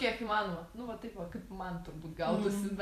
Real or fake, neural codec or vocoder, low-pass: fake; vocoder, 44.1 kHz, 128 mel bands every 512 samples, BigVGAN v2; 14.4 kHz